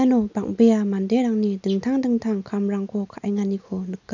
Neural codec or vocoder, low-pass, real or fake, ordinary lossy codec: none; 7.2 kHz; real; none